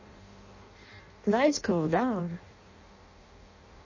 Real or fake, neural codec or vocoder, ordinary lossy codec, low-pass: fake; codec, 16 kHz in and 24 kHz out, 0.6 kbps, FireRedTTS-2 codec; MP3, 32 kbps; 7.2 kHz